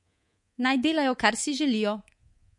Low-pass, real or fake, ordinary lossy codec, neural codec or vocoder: 10.8 kHz; fake; MP3, 48 kbps; codec, 24 kHz, 3.1 kbps, DualCodec